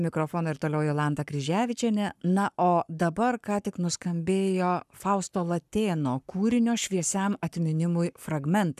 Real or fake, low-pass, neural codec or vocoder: fake; 14.4 kHz; codec, 44.1 kHz, 7.8 kbps, Pupu-Codec